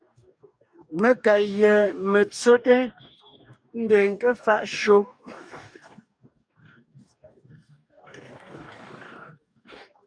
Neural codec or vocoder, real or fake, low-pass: codec, 44.1 kHz, 2.6 kbps, DAC; fake; 9.9 kHz